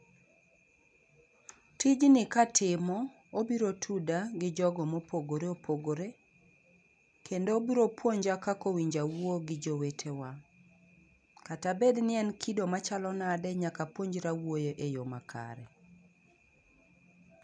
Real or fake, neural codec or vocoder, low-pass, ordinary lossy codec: real; none; 9.9 kHz; MP3, 96 kbps